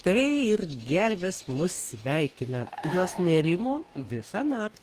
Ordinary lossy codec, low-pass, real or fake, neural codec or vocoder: Opus, 32 kbps; 14.4 kHz; fake; codec, 44.1 kHz, 2.6 kbps, DAC